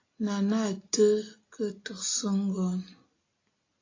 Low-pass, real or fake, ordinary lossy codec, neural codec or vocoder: 7.2 kHz; real; AAC, 32 kbps; none